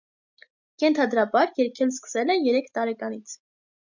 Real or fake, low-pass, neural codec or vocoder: real; 7.2 kHz; none